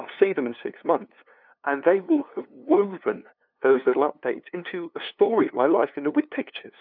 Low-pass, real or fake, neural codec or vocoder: 5.4 kHz; fake; codec, 16 kHz, 2 kbps, FunCodec, trained on LibriTTS, 25 frames a second